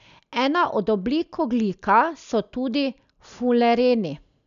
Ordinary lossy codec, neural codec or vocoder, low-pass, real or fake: none; none; 7.2 kHz; real